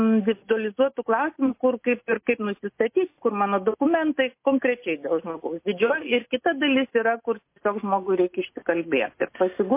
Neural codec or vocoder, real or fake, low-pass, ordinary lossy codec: none; real; 3.6 kHz; MP3, 24 kbps